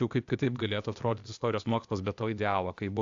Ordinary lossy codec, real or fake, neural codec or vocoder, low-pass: AAC, 64 kbps; fake; codec, 16 kHz, 0.8 kbps, ZipCodec; 7.2 kHz